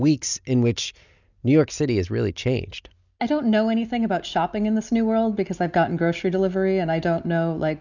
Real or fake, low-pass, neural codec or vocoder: real; 7.2 kHz; none